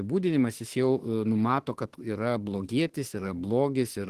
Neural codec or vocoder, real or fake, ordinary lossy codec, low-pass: autoencoder, 48 kHz, 32 numbers a frame, DAC-VAE, trained on Japanese speech; fake; Opus, 16 kbps; 14.4 kHz